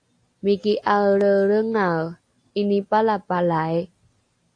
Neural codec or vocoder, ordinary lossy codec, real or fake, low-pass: none; AAC, 48 kbps; real; 9.9 kHz